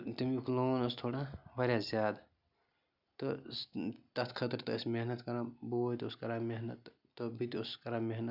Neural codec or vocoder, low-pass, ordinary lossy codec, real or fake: none; 5.4 kHz; none; real